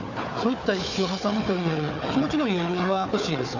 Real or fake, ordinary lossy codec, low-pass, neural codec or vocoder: fake; none; 7.2 kHz; codec, 16 kHz, 4 kbps, FunCodec, trained on Chinese and English, 50 frames a second